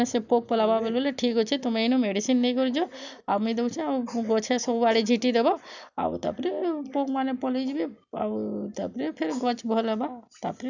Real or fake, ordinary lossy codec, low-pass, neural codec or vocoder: real; none; 7.2 kHz; none